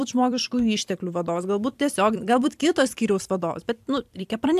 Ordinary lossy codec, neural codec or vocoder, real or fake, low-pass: AAC, 96 kbps; vocoder, 44.1 kHz, 128 mel bands every 256 samples, BigVGAN v2; fake; 14.4 kHz